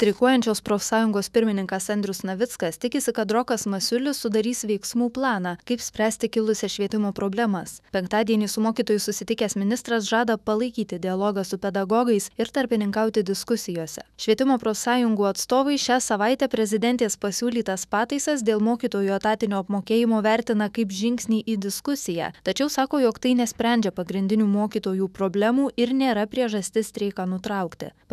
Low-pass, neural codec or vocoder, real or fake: 14.4 kHz; autoencoder, 48 kHz, 128 numbers a frame, DAC-VAE, trained on Japanese speech; fake